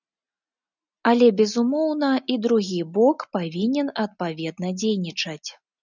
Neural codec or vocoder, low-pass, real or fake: none; 7.2 kHz; real